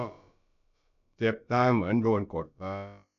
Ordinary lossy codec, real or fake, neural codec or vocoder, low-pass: none; fake; codec, 16 kHz, about 1 kbps, DyCAST, with the encoder's durations; 7.2 kHz